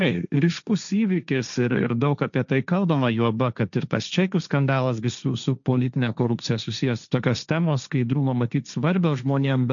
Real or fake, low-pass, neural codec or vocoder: fake; 7.2 kHz; codec, 16 kHz, 1.1 kbps, Voila-Tokenizer